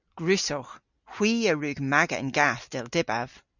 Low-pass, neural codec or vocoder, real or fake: 7.2 kHz; none; real